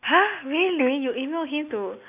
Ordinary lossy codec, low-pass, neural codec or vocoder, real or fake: none; 3.6 kHz; none; real